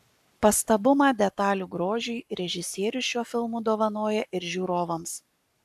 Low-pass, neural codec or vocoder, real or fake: 14.4 kHz; codec, 44.1 kHz, 7.8 kbps, Pupu-Codec; fake